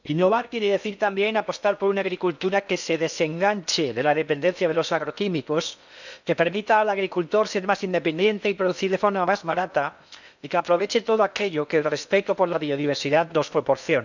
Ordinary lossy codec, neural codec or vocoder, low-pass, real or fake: none; codec, 16 kHz in and 24 kHz out, 0.8 kbps, FocalCodec, streaming, 65536 codes; 7.2 kHz; fake